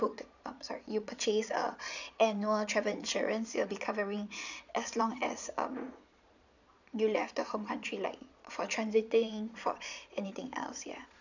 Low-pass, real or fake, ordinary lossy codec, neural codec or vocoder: 7.2 kHz; fake; none; vocoder, 22.05 kHz, 80 mel bands, Vocos